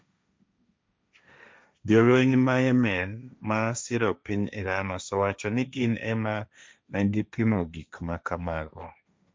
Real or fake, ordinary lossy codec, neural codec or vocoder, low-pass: fake; none; codec, 16 kHz, 1.1 kbps, Voila-Tokenizer; none